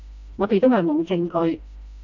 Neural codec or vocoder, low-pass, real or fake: codec, 16 kHz, 1 kbps, FreqCodec, smaller model; 7.2 kHz; fake